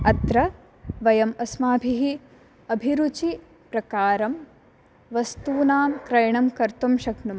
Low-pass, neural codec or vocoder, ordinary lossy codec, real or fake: none; none; none; real